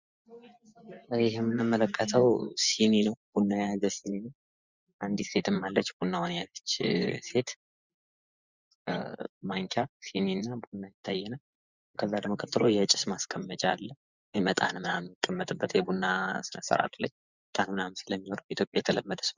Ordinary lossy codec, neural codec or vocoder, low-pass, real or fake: Opus, 64 kbps; vocoder, 24 kHz, 100 mel bands, Vocos; 7.2 kHz; fake